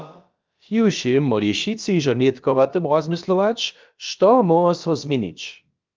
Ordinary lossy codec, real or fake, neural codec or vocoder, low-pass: Opus, 24 kbps; fake; codec, 16 kHz, about 1 kbps, DyCAST, with the encoder's durations; 7.2 kHz